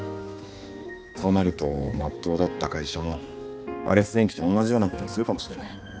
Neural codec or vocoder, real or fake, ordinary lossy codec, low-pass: codec, 16 kHz, 2 kbps, X-Codec, HuBERT features, trained on balanced general audio; fake; none; none